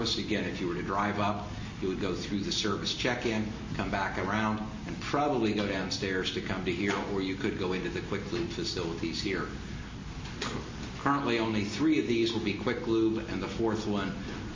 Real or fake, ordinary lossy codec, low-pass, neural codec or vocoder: real; MP3, 32 kbps; 7.2 kHz; none